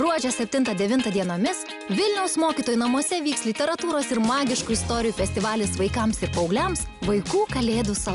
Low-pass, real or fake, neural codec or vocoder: 10.8 kHz; real; none